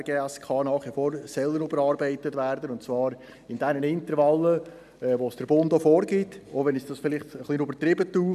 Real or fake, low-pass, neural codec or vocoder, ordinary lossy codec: real; 14.4 kHz; none; none